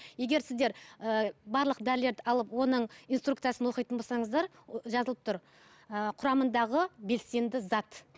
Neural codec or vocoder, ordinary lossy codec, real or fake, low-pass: none; none; real; none